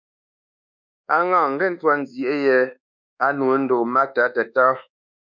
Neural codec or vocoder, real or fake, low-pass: codec, 24 kHz, 1.2 kbps, DualCodec; fake; 7.2 kHz